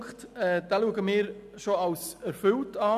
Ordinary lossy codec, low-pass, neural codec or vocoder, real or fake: none; 14.4 kHz; none; real